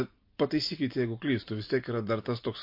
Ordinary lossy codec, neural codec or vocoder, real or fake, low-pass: MP3, 32 kbps; none; real; 5.4 kHz